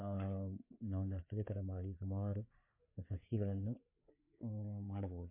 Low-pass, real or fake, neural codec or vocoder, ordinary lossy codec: 3.6 kHz; fake; codec, 16 kHz, 4 kbps, FreqCodec, larger model; MP3, 24 kbps